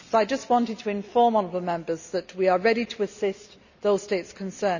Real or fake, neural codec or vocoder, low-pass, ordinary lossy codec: real; none; 7.2 kHz; none